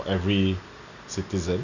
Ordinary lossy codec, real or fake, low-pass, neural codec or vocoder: none; real; 7.2 kHz; none